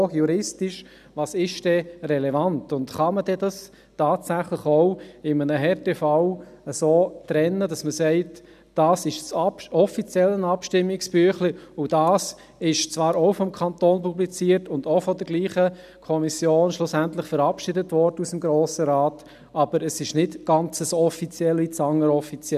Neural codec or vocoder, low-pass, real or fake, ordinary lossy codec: none; 14.4 kHz; real; none